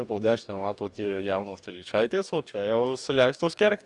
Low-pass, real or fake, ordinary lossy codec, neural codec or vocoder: 10.8 kHz; fake; Opus, 64 kbps; codec, 44.1 kHz, 2.6 kbps, DAC